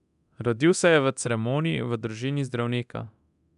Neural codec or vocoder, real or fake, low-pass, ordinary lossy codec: codec, 24 kHz, 0.9 kbps, DualCodec; fake; 10.8 kHz; none